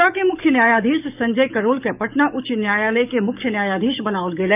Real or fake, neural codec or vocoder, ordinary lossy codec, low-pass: fake; codec, 44.1 kHz, 7.8 kbps, DAC; none; 3.6 kHz